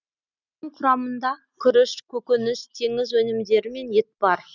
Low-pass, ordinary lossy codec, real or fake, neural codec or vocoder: 7.2 kHz; none; real; none